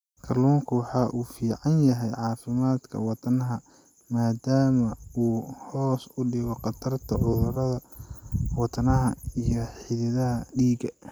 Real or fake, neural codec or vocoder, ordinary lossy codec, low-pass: real; none; none; 19.8 kHz